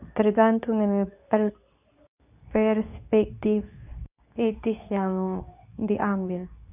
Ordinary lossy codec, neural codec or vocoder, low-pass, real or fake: none; codec, 24 kHz, 0.9 kbps, WavTokenizer, medium speech release version 2; 3.6 kHz; fake